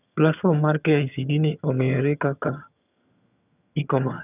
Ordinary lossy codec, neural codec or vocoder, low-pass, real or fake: none; vocoder, 22.05 kHz, 80 mel bands, HiFi-GAN; 3.6 kHz; fake